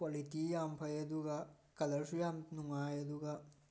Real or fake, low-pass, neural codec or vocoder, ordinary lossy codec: real; none; none; none